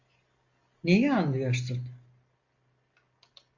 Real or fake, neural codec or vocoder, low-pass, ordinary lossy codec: real; none; 7.2 kHz; MP3, 48 kbps